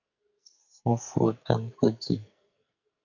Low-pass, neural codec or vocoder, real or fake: 7.2 kHz; codec, 44.1 kHz, 2.6 kbps, SNAC; fake